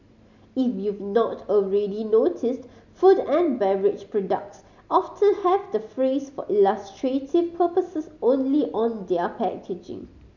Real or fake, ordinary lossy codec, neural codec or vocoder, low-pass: real; none; none; 7.2 kHz